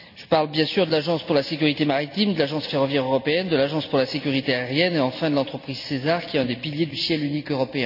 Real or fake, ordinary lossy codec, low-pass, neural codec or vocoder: real; none; 5.4 kHz; none